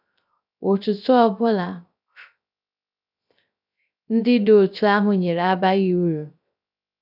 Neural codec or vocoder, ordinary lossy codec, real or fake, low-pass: codec, 16 kHz, 0.3 kbps, FocalCodec; none; fake; 5.4 kHz